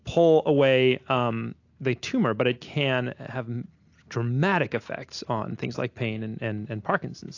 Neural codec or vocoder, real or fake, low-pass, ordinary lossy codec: none; real; 7.2 kHz; AAC, 48 kbps